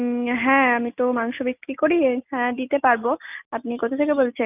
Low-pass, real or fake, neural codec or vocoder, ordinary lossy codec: 3.6 kHz; real; none; none